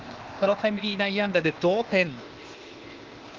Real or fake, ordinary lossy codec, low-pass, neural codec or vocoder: fake; Opus, 24 kbps; 7.2 kHz; codec, 16 kHz, 0.8 kbps, ZipCodec